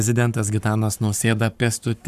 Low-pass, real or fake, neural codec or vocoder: 14.4 kHz; fake; codec, 44.1 kHz, 7.8 kbps, Pupu-Codec